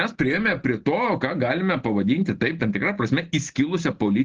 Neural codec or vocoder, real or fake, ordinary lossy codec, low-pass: none; real; Opus, 16 kbps; 7.2 kHz